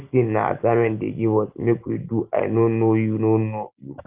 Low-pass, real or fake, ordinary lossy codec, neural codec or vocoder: 3.6 kHz; real; Opus, 32 kbps; none